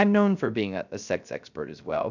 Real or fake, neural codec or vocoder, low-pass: fake; codec, 16 kHz, 0.3 kbps, FocalCodec; 7.2 kHz